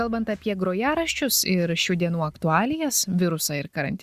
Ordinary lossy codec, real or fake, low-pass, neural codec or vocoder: Opus, 64 kbps; real; 14.4 kHz; none